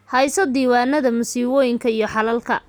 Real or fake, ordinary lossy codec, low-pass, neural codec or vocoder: real; none; none; none